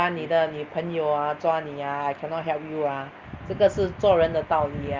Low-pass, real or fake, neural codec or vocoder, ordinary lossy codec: 7.2 kHz; real; none; Opus, 24 kbps